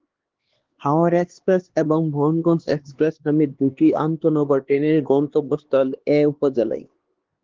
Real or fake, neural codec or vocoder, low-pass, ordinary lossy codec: fake; codec, 16 kHz, 2 kbps, X-Codec, HuBERT features, trained on LibriSpeech; 7.2 kHz; Opus, 16 kbps